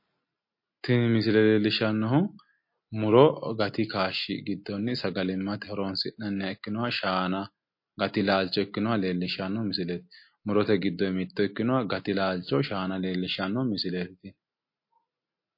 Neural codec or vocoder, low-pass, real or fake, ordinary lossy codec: none; 5.4 kHz; real; MP3, 32 kbps